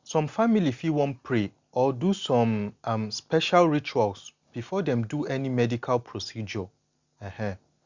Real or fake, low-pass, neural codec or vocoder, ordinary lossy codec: real; 7.2 kHz; none; Opus, 64 kbps